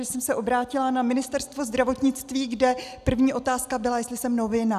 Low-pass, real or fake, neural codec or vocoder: 14.4 kHz; real; none